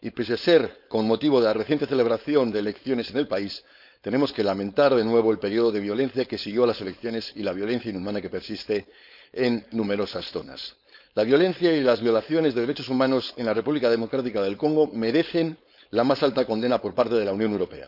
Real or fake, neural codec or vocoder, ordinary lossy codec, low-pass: fake; codec, 16 kHz, 4.8 kbps, FACodec; none; 5.4 kHz